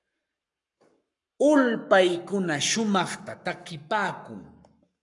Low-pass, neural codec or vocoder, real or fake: 10.8 kHz; codec, 44.1 kHz, 7.8 kbps, Pupu-Codec; fake